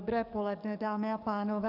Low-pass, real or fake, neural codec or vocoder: 5.4 kHz; fake; codec, 44.1 kHz, 7.8 kbps, DAC